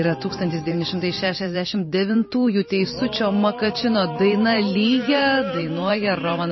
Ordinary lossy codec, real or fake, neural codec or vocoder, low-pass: MP3, 24 kbps; fake; vocoder, 44.1 kHz, 128 mel bands every 512 samples, BigVGAN v2; 7.2 kHz